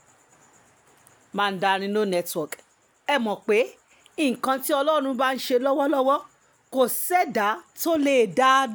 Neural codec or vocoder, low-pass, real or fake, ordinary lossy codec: none; none; real; none